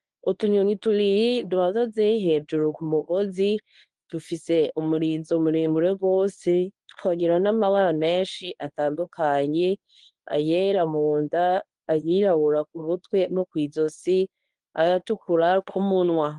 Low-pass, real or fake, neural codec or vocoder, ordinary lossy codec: 10.8 kHz; fake; codec, 24 kHz, 0.9 kbps, WavTokenizer, medium speech release version 1; Opus, 24 kbps